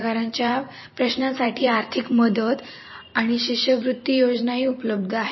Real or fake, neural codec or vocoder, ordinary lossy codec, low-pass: fake; vocoder, 44.1 kHz, 128 mel bands every 512 samples, BigVGAN v2; MP3, 24 kbps; 7.2 kHz